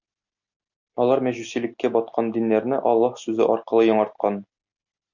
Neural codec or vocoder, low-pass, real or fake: none; 7.2 kHz; real